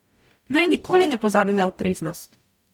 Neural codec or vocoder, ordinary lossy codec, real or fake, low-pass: codec, 44.1 kHz, 0.9 kbps, DAC; none; fake; 19.8 kHz